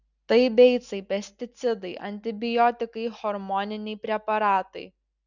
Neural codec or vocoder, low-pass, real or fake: none; 7.2 kHz; real